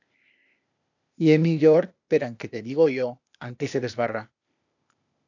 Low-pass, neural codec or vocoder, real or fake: 7.2 kHz; codec, 16 kHz, 0.8 kbps, ZipCodec; fake